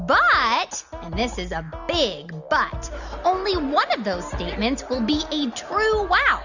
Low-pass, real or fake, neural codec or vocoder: 7.2 kHz; real; none